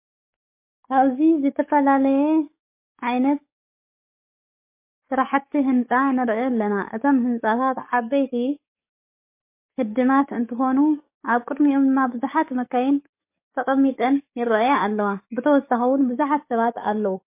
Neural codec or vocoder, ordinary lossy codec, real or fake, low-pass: codec, 44.1 kHz, 7.8 kbps, DAC; MP3, 24 kbps; fake; 3.6 kHz